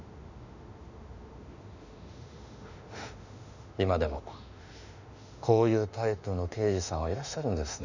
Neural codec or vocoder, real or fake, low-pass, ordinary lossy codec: autoencoder, 48 kHz, 32 numbers a frame, DAC-VAE, trained on Japanese speech; fake; 7.2 kHz; none